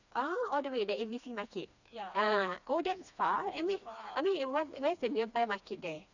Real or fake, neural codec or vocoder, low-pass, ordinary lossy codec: fake; codec, 16 kHz, 2 kbps, FreqCodec, smaller model; 7.2 kHz; none